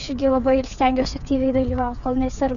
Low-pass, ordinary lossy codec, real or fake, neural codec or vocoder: 7.2 kHz; MP3, 96 kbps; fake; codec, 16 kHz, 8 kbps, FreqCodec, smaller model